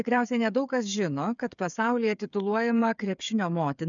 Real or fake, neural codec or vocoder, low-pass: fake; codec, 16 kHz, 8 kbps, FreqCodec, smaller model; 7.2 kHz